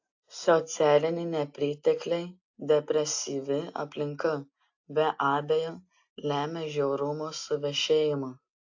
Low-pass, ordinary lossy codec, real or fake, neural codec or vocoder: 7.2 kHz; AAC, 48 kbps; real; none